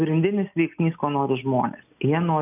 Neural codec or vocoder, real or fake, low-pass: none; real; 3.6 kHz